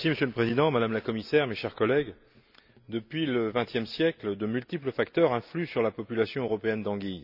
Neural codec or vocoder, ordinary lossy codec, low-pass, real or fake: none; none; 5.4 kHz; real